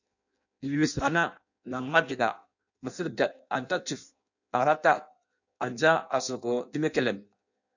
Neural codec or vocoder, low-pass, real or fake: codec, 16 kHz in and 24 kHz out, 0.6 kbps, FireRedTTS-2 codec; 7.2 kHz; fake